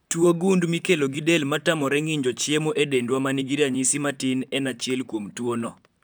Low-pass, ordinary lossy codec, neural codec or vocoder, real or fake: none; none; vocoder, 44.1 kHz, 128 mel bands, Pupu-Vocoder; fake